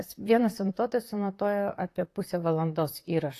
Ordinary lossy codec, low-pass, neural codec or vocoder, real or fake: AAC, 48 kbps; 14.4 kHz; vocoder, 44.1 kHz, 128 mel bands, Pupu-Vocoder; fake